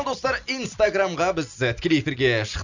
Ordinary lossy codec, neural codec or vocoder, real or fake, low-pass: none; none; real; 7.2 kHz